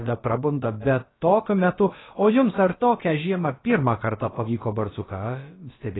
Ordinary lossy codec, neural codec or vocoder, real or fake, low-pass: AAC, 16 kbps; codec, 16 kHz, about 1 kbps, DyCAST, with the encoder's durations; fake; 7.2 kHz